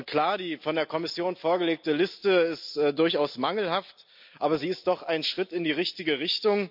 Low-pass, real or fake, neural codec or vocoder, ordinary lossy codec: 5.4 kHz; real; none; MP3, 48 kbps